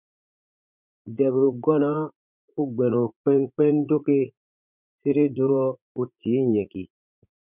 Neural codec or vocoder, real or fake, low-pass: vocoder, 44.1 kHz, 80 mel bands, Vocos; fake; 3.6 kHz